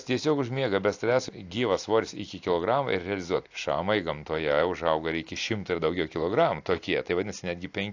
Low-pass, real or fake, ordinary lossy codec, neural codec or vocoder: 7.2 kHz; real; AAC, 48 kbps; none